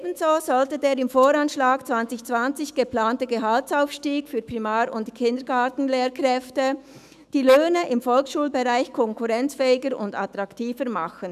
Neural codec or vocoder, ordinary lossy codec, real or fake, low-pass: autoencoder, 48 kHz, 128 numbers a frame, DAC-VAE, trained on Japanese speech; none; fake; 14.4 kHz